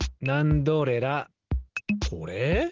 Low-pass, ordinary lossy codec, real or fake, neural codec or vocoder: 7.2 kHz; Opus, 16 kbps; real; none